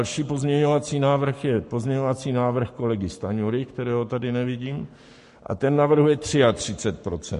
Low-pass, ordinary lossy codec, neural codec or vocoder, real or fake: 14.4 kHz; MP3, 48 kbps; codec, 44.1 kHz, 7.8 kbps, Pupu-Codec; fake